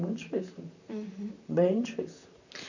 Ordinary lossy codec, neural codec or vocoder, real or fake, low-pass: none; vocoder, 44.1 kHz, 128 mel bands, Pupu-Vocoder; fake; 7.2 kHz